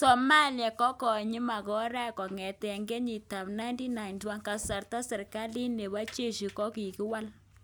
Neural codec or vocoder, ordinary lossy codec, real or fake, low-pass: none; none; real; none